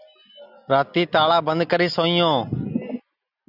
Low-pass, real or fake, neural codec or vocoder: 5.4 kHz; real; none